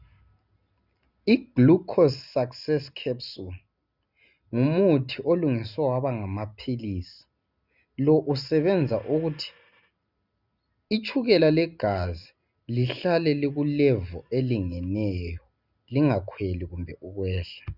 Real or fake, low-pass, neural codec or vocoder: real; 5.4 kHz; none